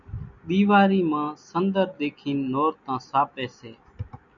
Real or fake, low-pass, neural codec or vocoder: real; 7.2 kHz; none